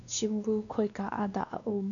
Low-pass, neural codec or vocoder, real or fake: 7.2 kHz; codec, 16 kHz, 2 kbps, X-Codec, WavLM features, trained on Multilingual LibriSpeech; fake